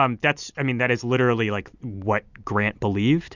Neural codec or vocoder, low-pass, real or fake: none; 7.2 kHz; real